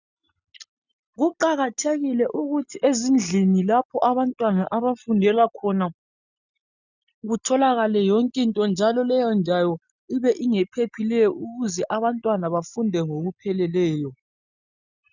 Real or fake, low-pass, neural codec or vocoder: real; 7.2 kHz; none